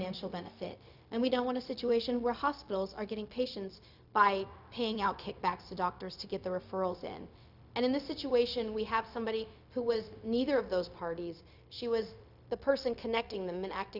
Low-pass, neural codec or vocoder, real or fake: 5.4 kHz; codec, 16 kHz, 0.4 kbps, LongCat-Audio-Codec; fake